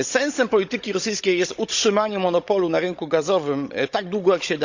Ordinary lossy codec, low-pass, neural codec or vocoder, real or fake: Opus, 64 kbps; 7.2 kHz; codec, 16 kHz, 16 kbps, FunCodec, trained on Chinese and English, 50 frames a second; fake